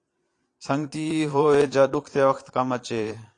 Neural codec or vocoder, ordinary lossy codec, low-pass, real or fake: vocoder, 22.05 kHz, 80 mel bands, Vocos; AAC, 48 kbps; 9.9 kHz; fake